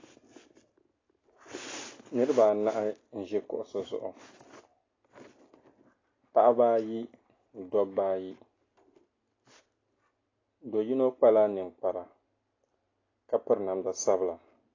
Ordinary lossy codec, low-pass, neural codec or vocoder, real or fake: AAC, 32 kbps; 7.2 kHz; none; real